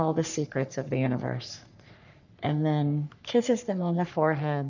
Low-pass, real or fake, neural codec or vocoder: 7.2 kHz; fake; codec, 44.1 kHz, 3.4 kbps, Pupu-Codec